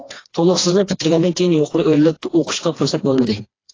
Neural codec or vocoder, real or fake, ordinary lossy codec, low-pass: codec, 16 kHz, 2 kbps, FreqCodec, smaller model; fake; AAC, 32 kbps; 7.2 kHz